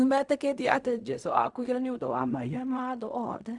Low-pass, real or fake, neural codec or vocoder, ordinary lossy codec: 10.8 kHz; fake; codec, 16 kHz in and 24 kHz out, 0.4 kbps, LongCat-Audio-Codec, fine tuned four codebook decoder; Opus, 64 kbps